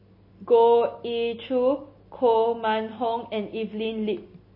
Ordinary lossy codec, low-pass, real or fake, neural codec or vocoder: MP3, 24 kbps; 5.4 kHz; real; none